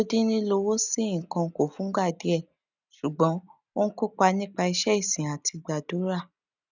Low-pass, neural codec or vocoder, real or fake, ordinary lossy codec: 7.2 kHz; none; real; none